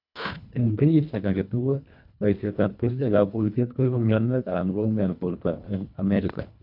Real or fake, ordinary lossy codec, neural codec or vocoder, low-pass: fake; none; codec, 24 kHz, 1.5 kbps, HILCodec; 5.4 kHz